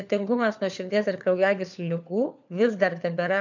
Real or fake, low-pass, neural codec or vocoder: fake; 7.2 kHz; codec, 16 kHz, 4 kbps, FunCodec, trained on LibriTTS, 50 frames a second